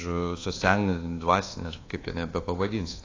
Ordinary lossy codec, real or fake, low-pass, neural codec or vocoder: AAC, 32 kbps; fake; 7.2 kHz; codec, 24 kHz, 1.2 kbps, DualCodec